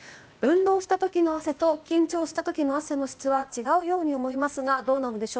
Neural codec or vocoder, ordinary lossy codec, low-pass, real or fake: codec, 16 kHz, 0.8 kbps, ZipCodec; none; none; fake